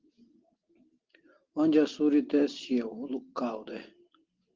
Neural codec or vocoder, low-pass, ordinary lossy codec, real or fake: none; 7.2 kHz; Opus, 16 kbps; real